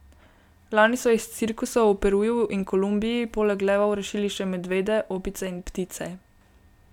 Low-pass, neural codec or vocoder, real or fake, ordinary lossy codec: 19.8 kHz; none; real; none